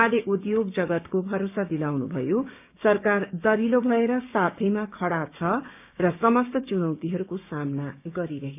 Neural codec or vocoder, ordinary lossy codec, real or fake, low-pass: codec, 16 kHz, 6 kbps, DAC; none; fake; 3.6 kHz